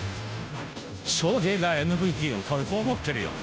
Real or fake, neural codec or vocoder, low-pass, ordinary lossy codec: fake; codec, 16 kHz, 0.5 kbps, FunCodec, trained on Chinese and English, 25 frames a second; none; none